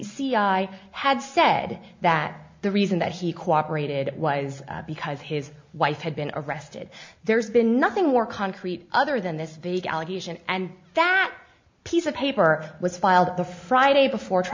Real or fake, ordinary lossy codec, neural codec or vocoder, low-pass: real; MP3, 48 kbps; none; 7.2 kHz